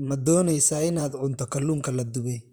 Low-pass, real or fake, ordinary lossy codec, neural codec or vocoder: none; fake; none; vocoder, 44.1 kHz, 128 mel bands, Pupu-Vocoder